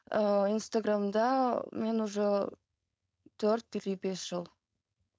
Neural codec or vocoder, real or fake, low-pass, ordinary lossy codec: codec, 16 kHz, 4.8 kbps, FACodec; fake; none; none